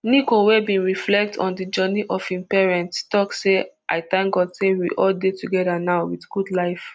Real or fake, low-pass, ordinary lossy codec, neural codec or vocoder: real; none; none; none